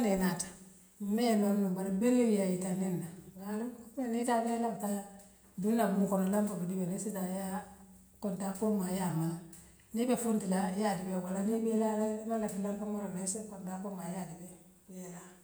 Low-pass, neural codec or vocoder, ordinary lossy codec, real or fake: none; vocoder, 48 kHz, 128 mel bands, Vocos; none; fake